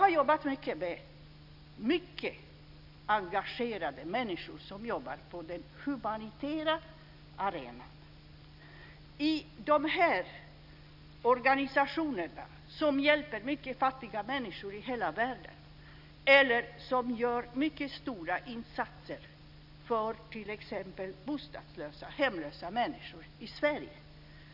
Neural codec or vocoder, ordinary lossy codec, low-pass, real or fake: none; none; 5.4 kHz; real